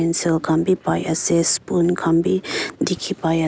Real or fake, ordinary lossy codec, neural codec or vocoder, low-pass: real; none; none; none